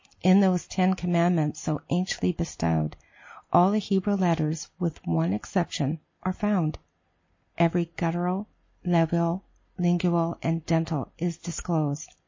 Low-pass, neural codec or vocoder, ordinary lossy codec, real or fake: 7.2 kHz; none; MP3, 32 kbps; real